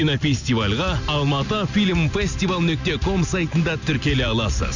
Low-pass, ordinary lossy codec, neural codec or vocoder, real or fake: 7.2 kHz; none; none; real